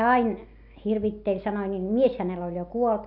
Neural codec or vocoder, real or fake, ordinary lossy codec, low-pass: none; real; none; 5.4 kHz